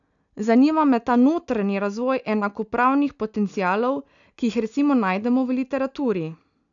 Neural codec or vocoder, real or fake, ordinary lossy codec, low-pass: none; real; none; 7.2 kHz